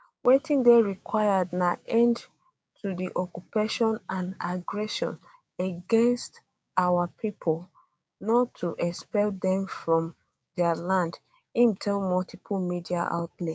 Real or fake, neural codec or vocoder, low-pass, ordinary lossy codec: fake; codec, 16 kHz, 6 kbps, DAC; none; none